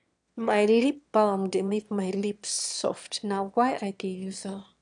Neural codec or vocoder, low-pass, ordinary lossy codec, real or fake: autoencoder, 22.05 kHz, a latent of 192 numbers a frame, VITS, trained on one speaker; 9.9 kHz; none; fake